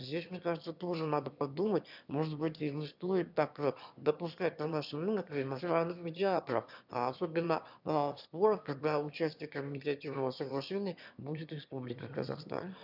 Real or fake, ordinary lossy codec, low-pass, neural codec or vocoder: fake; none; 5.4 kHz; autoencoder, 22.05 kHz, a latent of 192 numbers a frame, VITS, trained on one speaker